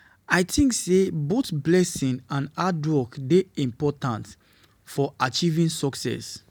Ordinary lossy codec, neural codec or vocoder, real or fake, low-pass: none; none; real; none